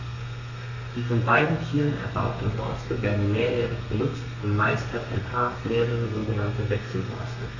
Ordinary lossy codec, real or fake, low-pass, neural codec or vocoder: none; fake; 7.2 kHz; codec, 32 kHz, 1.9 kbps, SNAC